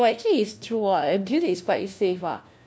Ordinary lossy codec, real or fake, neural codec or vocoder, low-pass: none; fake; codec, 16 kHz, 1 kbps, FunCodec, trained on LibriTTS, 50 frames a second; none